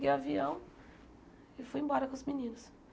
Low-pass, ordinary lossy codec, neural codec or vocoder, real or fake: none; none; none; real